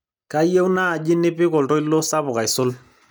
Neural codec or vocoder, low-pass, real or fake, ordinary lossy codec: none; none; real; none